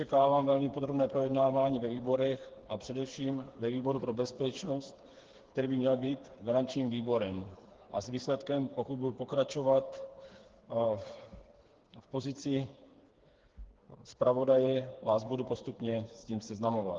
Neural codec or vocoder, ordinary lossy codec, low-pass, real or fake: codec, 16 kHz, 4 kbps, FreqCodec, smaller model; Opus, 16 kbps; 7.2 kHz; fake